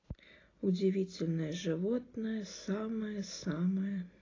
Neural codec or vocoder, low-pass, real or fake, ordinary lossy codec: none; 7.2 kHz; real; AAC, 32 kbps